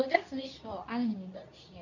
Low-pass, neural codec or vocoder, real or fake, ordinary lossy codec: 7.2 kHz; codec, 24 kHz, 0.9 kbps, WavTokenizer, medium speech release version 2; fake; none